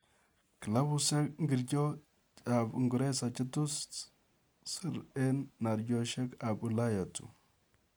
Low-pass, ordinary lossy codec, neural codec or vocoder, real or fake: none; none; none; real